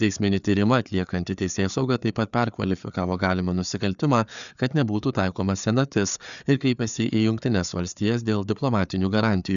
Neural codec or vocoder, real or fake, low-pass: codec, 16 kHz, 4 kbps, FreqCodec, larger model; fake; 7.2 kHz